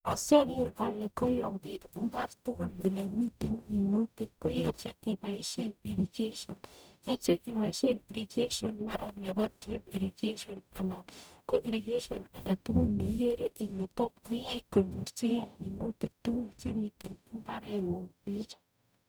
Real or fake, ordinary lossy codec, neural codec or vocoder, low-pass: fake; none; codec, 44.1 kHz, 0.9 kbps, DAC; none